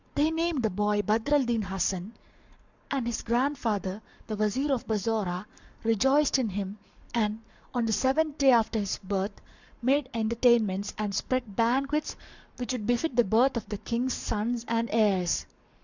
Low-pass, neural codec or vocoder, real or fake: 7.2 kHz; codec, 44.1 kHz, 7.8 kbps, Pupu-Codec; fake